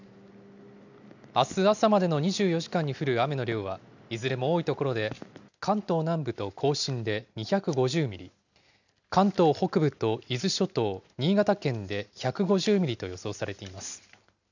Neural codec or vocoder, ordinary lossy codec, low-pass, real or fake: none; none; 7.2 kHz; real